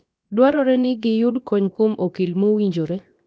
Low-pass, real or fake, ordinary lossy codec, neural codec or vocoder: none; fake; none; codec, 16 kHz, 0.7 kbps, FocalCodec